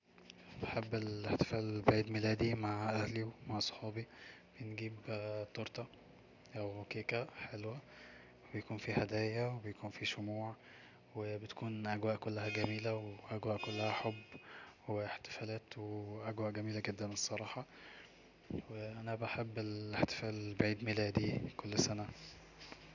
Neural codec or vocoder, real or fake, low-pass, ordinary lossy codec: none; real; 7.2 kHz; none